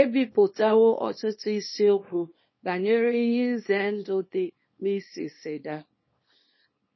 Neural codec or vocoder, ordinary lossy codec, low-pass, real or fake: codec, 24 kHz, 0.9 kbps, WavTokenizer, small release; MP3, 24 kbps; 7.2 kHz; fake